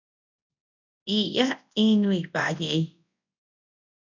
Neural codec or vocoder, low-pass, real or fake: codec, 24 kHz, 0.9 kbps, WavTokenizer, large speech release; 7.2 kHz; fake